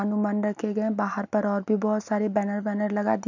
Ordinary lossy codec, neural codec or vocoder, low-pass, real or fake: none; none; 7.2 kHz; real